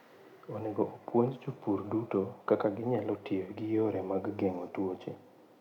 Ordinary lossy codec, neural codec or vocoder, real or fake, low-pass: none; none; real; 19.8 kHz